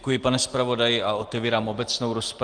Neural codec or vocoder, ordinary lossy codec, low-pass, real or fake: none; Opus, 24 kbps; 9.9 kHz; real